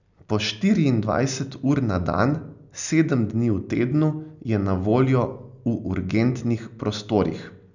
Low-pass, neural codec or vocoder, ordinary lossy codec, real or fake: 7.2 kHz; none; none; real